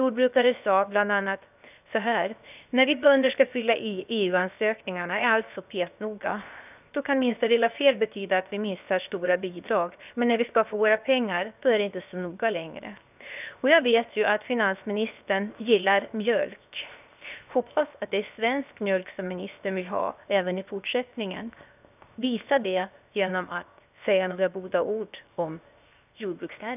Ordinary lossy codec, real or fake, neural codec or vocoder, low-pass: none; fake; codec, 16 kHz, 0.7 kbps, FocalCodec; 3.6 kHz